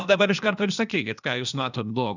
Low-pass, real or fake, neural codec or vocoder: 7.2 kHz; fake; codec, 16 kHz, 0.8 kbps, ZipCodec